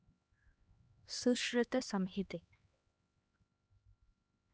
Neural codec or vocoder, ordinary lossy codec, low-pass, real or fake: codec, 16 kHz, 2 kbps, X-Codec, HuBERT features, trained on LibriSpeech; none; none; fake